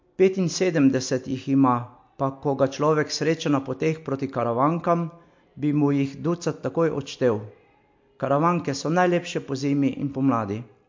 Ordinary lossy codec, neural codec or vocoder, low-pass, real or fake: MP3, 48 kbps; none; 7.2 kHz; real